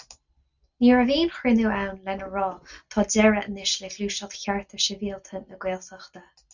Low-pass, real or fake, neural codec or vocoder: 7.2 kHz; real; none